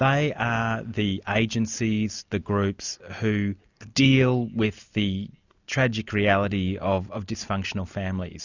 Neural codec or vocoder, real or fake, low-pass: none; real; 7.2 kHz